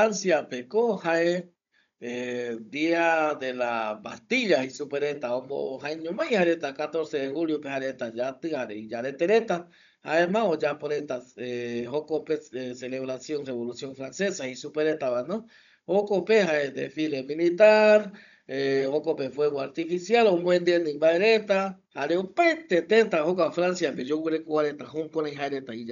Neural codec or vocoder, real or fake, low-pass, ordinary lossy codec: codec, 16 kHz, 16 kbps, FunCodec, trained on Chinese and English, 50 frames a second; fake; 7.2 kHz; none